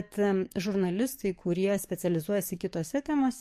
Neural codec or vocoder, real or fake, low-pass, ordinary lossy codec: codec, 44.1 kHz, 7.8 kbps, DAC; fake; 14.4 kHz; MP3, 64 kbps